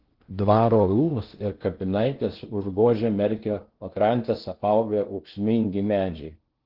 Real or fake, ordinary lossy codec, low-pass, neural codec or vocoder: fake; Opus, 16 kbps; 5.4 kHz; codec, 16 kHz in and 24 kHz out, 0.6 kbps, FocalCodec, streaming, 2048 codes